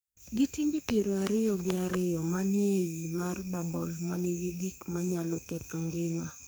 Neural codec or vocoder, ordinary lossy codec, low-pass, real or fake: codec, 44.1 kHz, 2.6 kbps, SNAC; none; none; fake